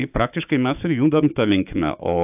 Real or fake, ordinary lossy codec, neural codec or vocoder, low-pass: fake; AAC, 32 kbps; codec, 16 kHz, 6 kbps, DAC; 3.6 kHz